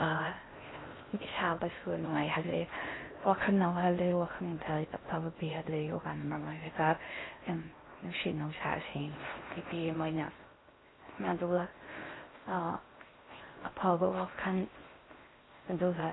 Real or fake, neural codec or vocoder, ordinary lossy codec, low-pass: fake; codec, 16 kHz in and 24 kHz out, 0.6 kbps, FocalCodec, streaming, 4096 codes; AAC, 16 kbps; 7.2 kHz